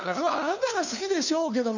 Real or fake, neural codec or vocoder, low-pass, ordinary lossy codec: fake; codec, 16 kHz, 2 kbps, FunCodec, trained on LibriTTS, 25 frames a second; 7.2 kHz; none